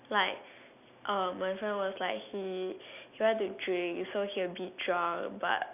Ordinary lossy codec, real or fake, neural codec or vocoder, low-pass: none; real; none; 3.6 kHz